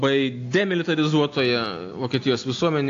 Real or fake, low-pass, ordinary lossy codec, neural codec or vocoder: real; 7.2 kHz; AAC, 48 kbps; none